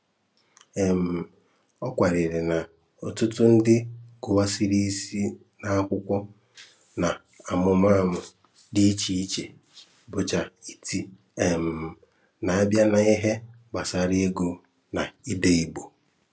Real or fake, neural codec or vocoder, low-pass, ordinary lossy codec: real; none; none; none